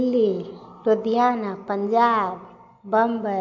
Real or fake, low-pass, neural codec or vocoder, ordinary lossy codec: real; 7.2 kHz; none; MP3, 48 kbps